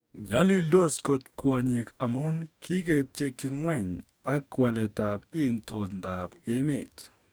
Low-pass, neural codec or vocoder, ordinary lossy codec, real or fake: none; codec, 44.1 kHz, 2.6 kbps, DAC; none; fake